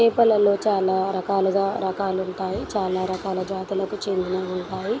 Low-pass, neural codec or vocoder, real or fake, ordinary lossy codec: none; none; real; none